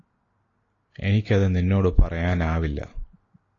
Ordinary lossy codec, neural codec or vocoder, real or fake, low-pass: AAC, 32 kbps; none; real; 7.2 kHz